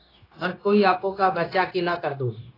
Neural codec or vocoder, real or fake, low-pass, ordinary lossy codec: codec, 16 kHz, 0.9 kbps, LongCat-Audio-Codec; fake; 5.4 kHz; AAC, 24 kbps